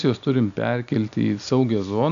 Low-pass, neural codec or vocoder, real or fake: 7.2 kHz; none; real